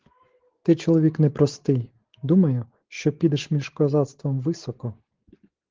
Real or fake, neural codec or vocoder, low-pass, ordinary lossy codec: real; none; 7.2 kHz; Opus, 16 kbps